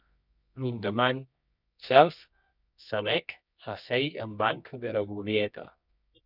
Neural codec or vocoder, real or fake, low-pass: codec, 24 kHz, 0.9 kbps, WavTokenizer, medium music audio release; fake; 5.4 kHz